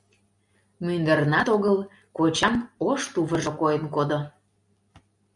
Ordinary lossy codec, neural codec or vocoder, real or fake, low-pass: MP3, 96 kbps; none; real; 10.8 kHz